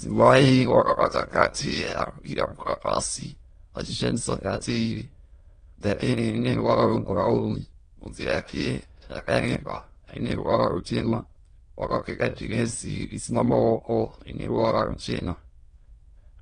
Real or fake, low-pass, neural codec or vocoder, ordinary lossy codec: fake; 9.9 kHz; autoencoder, 22.05 kHz, a latent of 192 numbers a frame, VITS, trained on many speakers; AAC, 32 kbps